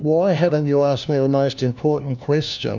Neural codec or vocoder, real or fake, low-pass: codec, 16 kHz, 1 kbps, FunCodec, trained on LibriTTS, 50 frames a second; fake; 7.2 kHz